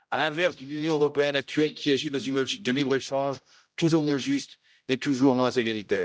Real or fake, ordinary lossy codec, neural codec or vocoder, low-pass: fake; none; codec, 16 kHz, 0.5 kbps, X-Codec, HuBERT features, trained on general audio; none